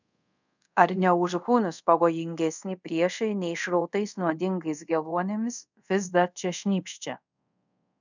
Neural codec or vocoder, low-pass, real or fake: codec, 24 kHz, 0.5 kbps, DualCodec; 7.2 kHz; fake